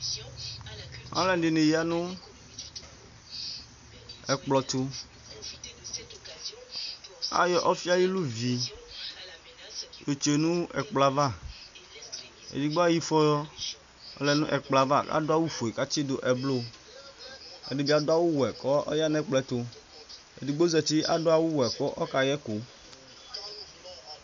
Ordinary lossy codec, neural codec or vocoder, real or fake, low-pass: AAC, 96 kbps; none; real; 7.2 kHz